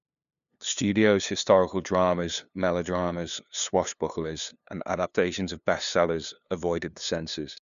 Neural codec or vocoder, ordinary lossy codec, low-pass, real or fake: codec, 16 kHz, 2 kbps, FunCodec, trained on LibriTTS, 25 frames a second; MP3, 96 kbps; 7.2 kHz; fake